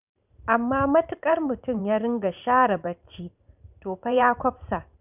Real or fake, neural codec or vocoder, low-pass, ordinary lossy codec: fake; vocoder, 44.1 kHz, 128 mel bands every 512 samples, BigVGAN v2; 3.6 kHz; none